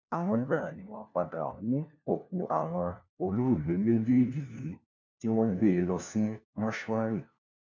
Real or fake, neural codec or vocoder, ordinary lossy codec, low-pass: fake; codec, 16 kHz, 1 kbps, FunCodec, trained on LibriTTS, 50 frames a second; none; 7.2 kHz